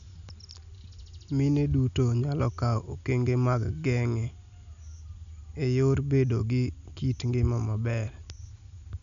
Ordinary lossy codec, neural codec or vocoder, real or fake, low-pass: none; none; real; 7.2 kHz